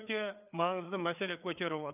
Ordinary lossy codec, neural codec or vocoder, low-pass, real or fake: none; codec, 16 kHz, 4 kbps, FreqCodec, larger model; 3.6 kHz; fake